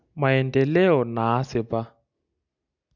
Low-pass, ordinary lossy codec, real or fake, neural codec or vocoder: 7.2 kHz; none; real; none